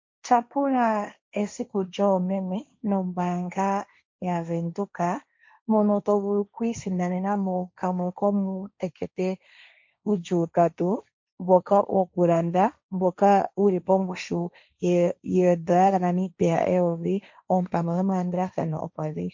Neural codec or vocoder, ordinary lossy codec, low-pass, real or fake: codec, 16 kHz, 1.1 kbps, Voila-Tokenizer; MP3, 48 kbps; 7.2 kHz; fake